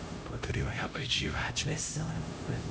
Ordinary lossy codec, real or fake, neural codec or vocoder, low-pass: none; fake; codec, 16 kHz, 0.5 kbps, X-Codec, HuBERT features, trained on LibriSpeech; none